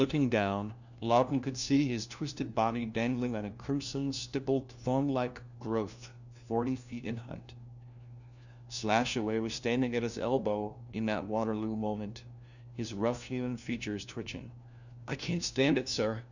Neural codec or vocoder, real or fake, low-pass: codec, 16 kHz, 1 kbps, FunCodec, trained on LibriTTS, 50 frames a second; fake; 7.2 kHz